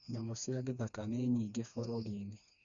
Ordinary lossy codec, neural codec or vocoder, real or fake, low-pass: none; codec, 16 kHz, 2 kbps, FreqCodec, smaller model; fake; 7.2 kHz